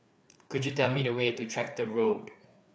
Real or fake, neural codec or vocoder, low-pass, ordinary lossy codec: fake; codec, 16 kHz, 4 kbps, FreqCodec, larger model; none; none